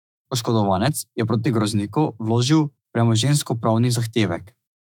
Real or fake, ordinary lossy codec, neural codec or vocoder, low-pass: fake; none; autoencoder, 48 kHz, 128 numbers a frame, DAC-VAE, trained on Japanese speech; 19.8 kHz